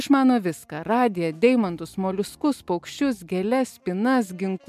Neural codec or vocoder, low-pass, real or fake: none; 14.4 kHz; real